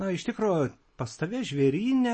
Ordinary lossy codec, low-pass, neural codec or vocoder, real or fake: MP3, 32 kbps; 9.9 kHz; none; real